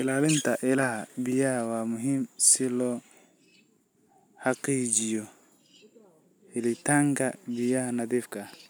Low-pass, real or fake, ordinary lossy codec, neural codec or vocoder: none; real; none; none